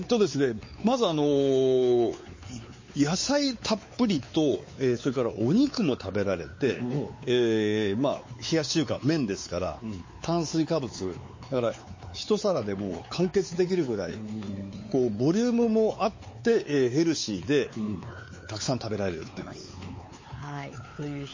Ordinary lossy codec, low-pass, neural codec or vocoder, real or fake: MP3, 32 kbps; 7.2 kHz; codec, 16 kHz, 4 kbps, X-Codec, WavLM features, trained on Multilingual LibriSpeech; fake